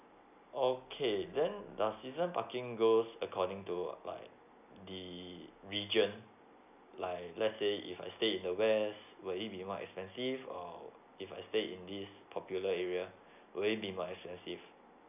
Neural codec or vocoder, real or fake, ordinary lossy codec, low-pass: none; real; none; 3.6 kHz